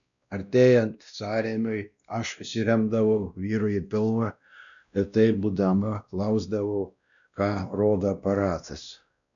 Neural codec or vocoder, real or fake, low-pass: codec, 16 kHz, 1 kbps, X-Codec, WavLM features, trained on Multilingual LibriSpeech; fake; 7.2 kHz